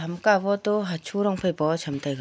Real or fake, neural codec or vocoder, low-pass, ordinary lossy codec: real; none; none; none